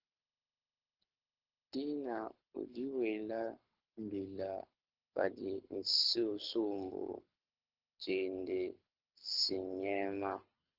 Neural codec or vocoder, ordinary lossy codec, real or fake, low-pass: codec, 24 kHz, 6 kbps, HILCodec; Opus, 16 kbps; fake; 5.4 kHz